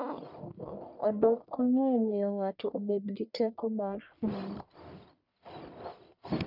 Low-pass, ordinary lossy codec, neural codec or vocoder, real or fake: 5.4 kHz; none; codec, 44.1 kHz, 1.7 kbps, Pupu-Codec; fake